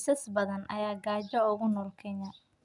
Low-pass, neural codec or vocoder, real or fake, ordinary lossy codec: 10.8 kHz; none; real; none